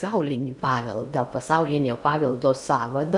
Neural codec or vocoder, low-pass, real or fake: codec, 16 kHz in and 24 kHz out, 0.8 kbps, FocalCodec, streaming, 65536 codes; 10.8 kHz; fake